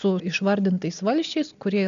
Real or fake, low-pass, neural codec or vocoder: real; 7.2 kHz; none